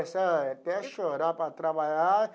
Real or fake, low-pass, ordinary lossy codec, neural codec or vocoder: real; none; none; none